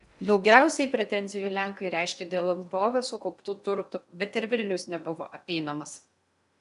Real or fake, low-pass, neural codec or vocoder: fake; 10.8 kHz; codec, 16 kHz in and 24 kHz out, 0.8 kbps, FocalCodec, streaming, 65536 codes